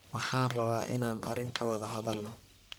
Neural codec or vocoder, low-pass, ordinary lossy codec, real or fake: codec, 44.1 kHz, 1.7 kbps, Pupu-Codec; none; none; fake